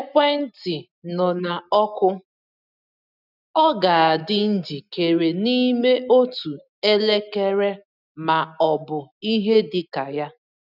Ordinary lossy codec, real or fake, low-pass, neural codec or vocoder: none; real; 5.4 kHz; none